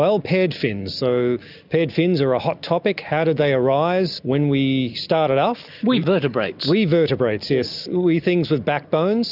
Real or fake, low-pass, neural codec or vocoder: real; 5.4 kHz; none